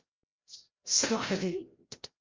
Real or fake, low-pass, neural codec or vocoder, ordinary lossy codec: fake; 7.2 kHz; codec, 16 kHz, 0.5 kbps, FreqCodec, larger model; Opus, 32 kbps